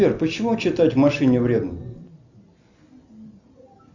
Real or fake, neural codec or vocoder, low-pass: real; none; 7.2 kHz